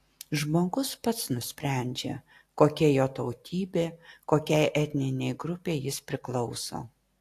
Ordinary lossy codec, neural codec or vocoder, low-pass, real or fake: AAC, 64 kbps; none; 14.4 kHz; real